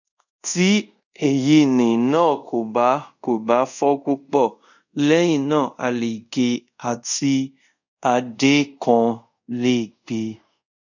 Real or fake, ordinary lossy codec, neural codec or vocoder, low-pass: fake; none; codec, 24 kHz, 0.5 kbps, DualCodec; 7.2 kHz